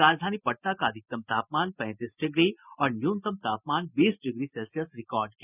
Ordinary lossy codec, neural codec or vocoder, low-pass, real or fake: none; none; 3.6 kHz; real